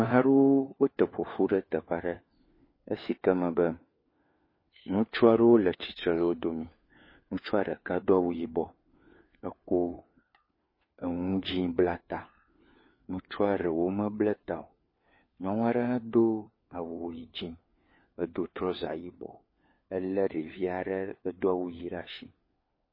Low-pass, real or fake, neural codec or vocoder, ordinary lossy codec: 5.4 kHz; fake; codec, 16 kHz, 4 kbps, FunCodec, trained on LibriTTS, 50 frames a second; MP3, 24 kbps